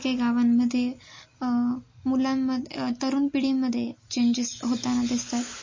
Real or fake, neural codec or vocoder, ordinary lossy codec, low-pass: real; none; MP3, 32 kbps; 7.2 kHz